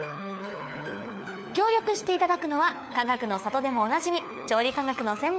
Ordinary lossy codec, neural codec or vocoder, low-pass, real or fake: none; codec, 16 kHz, 4 kbps, FunCodec, trained on LibriTTS, 50 frames a second; none; fake